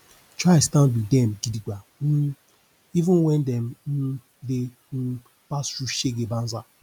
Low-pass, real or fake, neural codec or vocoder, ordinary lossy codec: 19.8 kHz; real; none; none